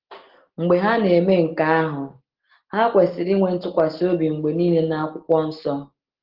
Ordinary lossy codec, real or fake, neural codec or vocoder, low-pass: Opus, 16 kbps; real; none; 5.4 kHz